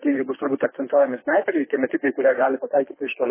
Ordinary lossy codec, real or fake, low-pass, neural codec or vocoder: MP3, 16 kbps; fake; 3.6 kHz; codec, 24 kHz, 3 kbps, HILCodec